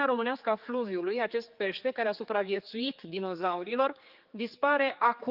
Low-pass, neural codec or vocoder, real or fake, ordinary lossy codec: 5.4 kHz; codec, 16 kHz, 4 kbps, X-Codec, HuBERT features, trained on general audio; fake; Opus, 24 kbps